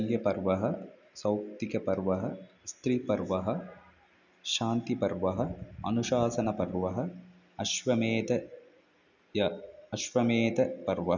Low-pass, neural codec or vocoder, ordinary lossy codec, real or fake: 7.2 kHz; none; none; real